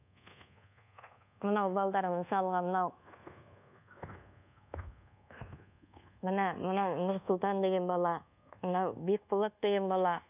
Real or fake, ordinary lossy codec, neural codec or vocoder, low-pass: fake; none; codec, 24 kHz, 1.2 kbps, DualCodec; 3.6 kHz